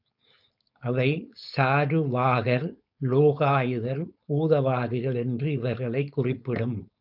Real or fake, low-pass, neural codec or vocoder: fake; 5.4 kHz; codec, 16 kHz, 4.8 kbps, FACodec